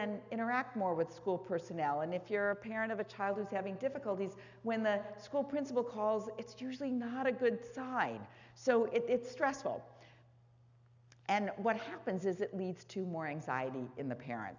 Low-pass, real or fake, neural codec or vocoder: 7.2 kHz; real; none